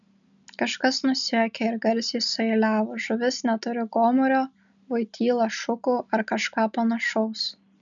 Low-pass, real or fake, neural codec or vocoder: 7.2 kHz; real; none